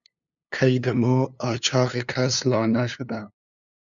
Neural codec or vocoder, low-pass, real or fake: codec, 16 kHz, 2 kbps, FunCodec, trained on LibriTTS, 25 frames a second; 7.2 kHz; fake